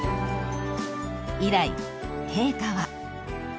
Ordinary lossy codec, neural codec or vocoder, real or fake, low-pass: none; none; real; none